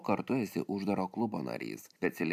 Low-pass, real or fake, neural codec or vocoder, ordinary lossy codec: 14.4 kHz; real; none; MP3, 96 kbps